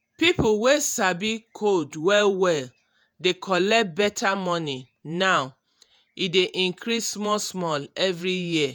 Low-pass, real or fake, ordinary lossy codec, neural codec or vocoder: none; fake; none; vocoder, 48 kHz, 128 mel bands, Vocos